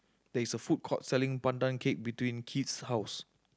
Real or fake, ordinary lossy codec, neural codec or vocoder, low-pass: real; none; none; none